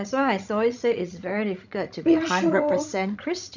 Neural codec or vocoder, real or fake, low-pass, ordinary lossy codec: codec, 16 kHz, 16 kbps, FreqCodec, larger model; fake; 7.2 kHz; none